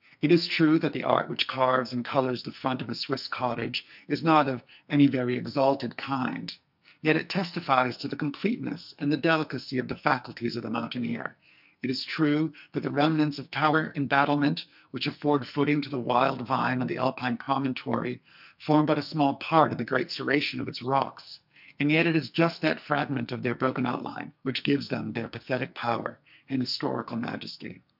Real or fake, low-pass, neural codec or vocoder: fake; 5.4 kHz; codec, 44.1 kHz, 2.6 kbps, SNAC